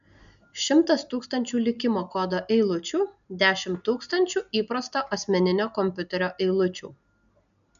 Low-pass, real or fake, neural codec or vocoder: 7.2 kHz; real; none